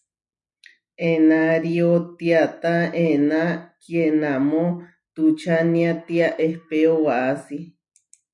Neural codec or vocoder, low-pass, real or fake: none; 10.8 kHz; real